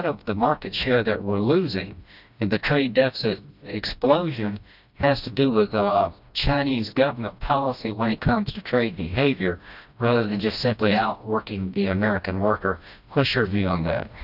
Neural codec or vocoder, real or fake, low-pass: codec, 16 kHz, 1 kbps, FreqCodec, smaller model; fake; 5.4 kHz